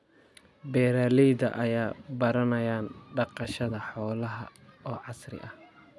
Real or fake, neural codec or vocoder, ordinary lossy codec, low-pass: real; none; none; none